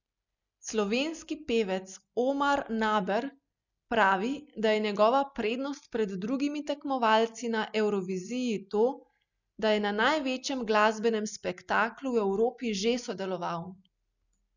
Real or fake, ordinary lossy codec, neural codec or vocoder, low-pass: real; none; none; 7.2 kHz